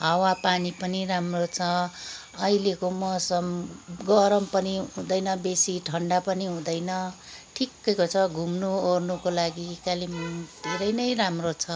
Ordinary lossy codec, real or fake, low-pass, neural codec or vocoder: none; real; none; none